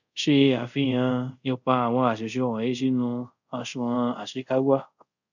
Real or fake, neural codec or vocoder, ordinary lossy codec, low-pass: fake; codec, 24 kHz, 0.5 kbps, DualCodec; none; 7.2 kHz